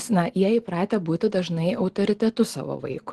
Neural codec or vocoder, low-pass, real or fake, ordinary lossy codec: none; 10.8 kHz; real; Opus, 16 kbps